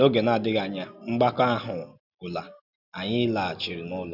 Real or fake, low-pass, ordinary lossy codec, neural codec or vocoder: real; 5.4 kHz; none; none